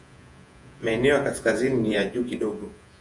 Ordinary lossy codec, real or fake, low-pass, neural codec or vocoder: AAC, 64 kbps; fake; 10.8 kHz; vocoder, 48 kHz, 128 mel bands, Vocos